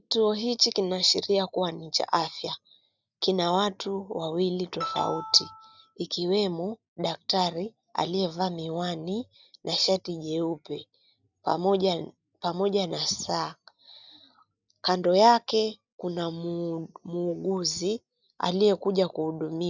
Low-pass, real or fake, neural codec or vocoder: 7.2 kHz; real; none